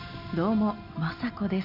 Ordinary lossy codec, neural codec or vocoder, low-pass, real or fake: none; none; 5.4 kHz; real